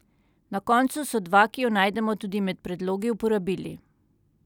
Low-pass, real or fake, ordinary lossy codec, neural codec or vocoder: 19.8 kHz; real; none; none